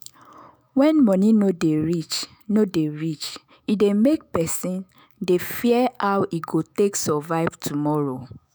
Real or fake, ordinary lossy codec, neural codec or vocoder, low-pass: fake; none; autoencoder, 48 kHz, 128 numbers a frame, DAC-VAE, trained on Japanese speech; none